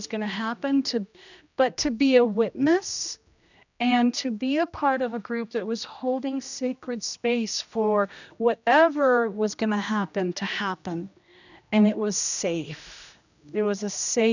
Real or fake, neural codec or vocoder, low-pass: fake; codec, 16 kHz, 1 kbps, X-Codec, HuBERT features, trained on general audio; 7.2 kHz